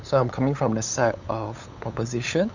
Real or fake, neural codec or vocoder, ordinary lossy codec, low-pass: fake; codec, 16 kHz, 8 kbps, FunCodec, trained on LibriTTS, 25 frames a second; none; 7.2 kHz